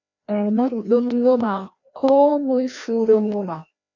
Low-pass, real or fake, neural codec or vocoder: 7.2 kHz; fake; codec, 16 kHz, 1 kbps, FreqCodec, larger model